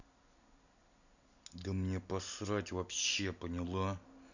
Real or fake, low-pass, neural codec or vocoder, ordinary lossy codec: real; 7.2 kHz; none; none